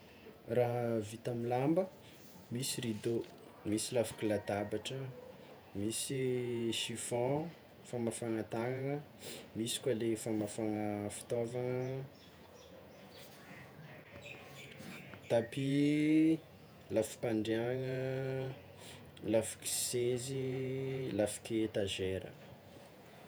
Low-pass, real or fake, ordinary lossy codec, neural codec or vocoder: none; fake; none; vocoder, 48 kHz, 128 mel bands, Vocos